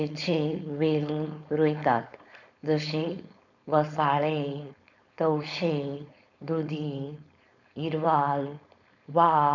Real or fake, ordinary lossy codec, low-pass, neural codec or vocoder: fake; none; 7.2 kHz; codec, 16 kHz, 4.8 kbps, FACodec